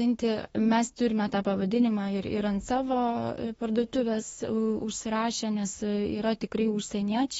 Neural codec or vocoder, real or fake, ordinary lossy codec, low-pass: autoencoder, 48 kHz, 32 numbers a frame, DAC-VAE, trained on Japanese speech; fake; AAC, 24 kbps; 19.8 kHz